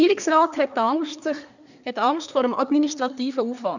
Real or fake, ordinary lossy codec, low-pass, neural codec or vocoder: fake; none; 7.2 kHz; codec, 24 kHz, 1 kbps, SNAC